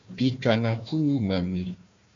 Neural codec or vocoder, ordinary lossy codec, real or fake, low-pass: codec, 16 kHz, 1 kbps, FunCodec, trained on Chinese and English, 50 frames a second; MP3, 64 kbps; fake; 7.2 kHz